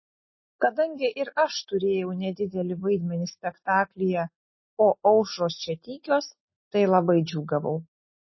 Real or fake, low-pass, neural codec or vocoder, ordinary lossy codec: real; 7.2 kHz; none; MP3, 24 kbps